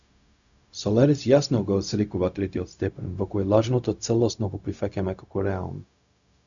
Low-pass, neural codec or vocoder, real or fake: 7.2 kHz; codec, 16 kHz, 0.4 kbps, LongCat-Audio-Codec; fake